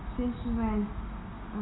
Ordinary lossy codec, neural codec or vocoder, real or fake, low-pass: AAC, 16 kbps; none; real; 7.2 kHz